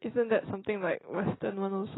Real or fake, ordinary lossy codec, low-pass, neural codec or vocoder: real; AAC, 16 kbps; 7.2 kHz; none